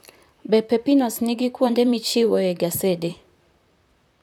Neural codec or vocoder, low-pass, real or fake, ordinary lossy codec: vocoder, 44.1 kHz, 128 mel bands, Pupu-Vocoder; none; fake; none